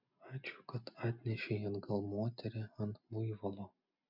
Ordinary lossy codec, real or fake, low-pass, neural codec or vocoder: MP3, 48 kbps; real; 5.4 kHz; none